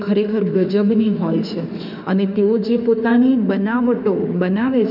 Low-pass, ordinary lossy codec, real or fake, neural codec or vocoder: 5.4 kHz; none; fake; autoencoder, 48 kHz, 32 numbers a frame, DAC-VAE, trained on Japanese speech